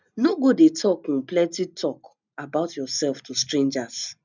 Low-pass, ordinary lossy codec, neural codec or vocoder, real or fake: 7.2 kHz; none; none; real